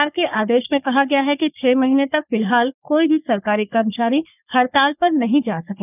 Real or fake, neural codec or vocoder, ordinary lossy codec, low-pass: fake; codec, 44.1 kHz, 3.4 kbps, Pupu-Codec; none; 3.6 kHz